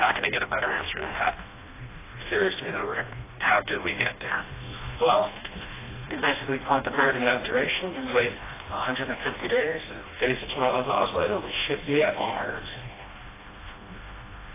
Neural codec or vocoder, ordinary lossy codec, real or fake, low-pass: codec, 16 kHz, 1 kbps, FreqCodec, smaller model; AAC, 16 kbps; fake; 3.6 kHz